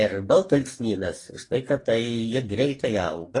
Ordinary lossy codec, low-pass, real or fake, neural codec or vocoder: AAC, 32 kbps; 10.8 kHz; fake; codec, 44.1 kHz, 2.6 kbps, DAC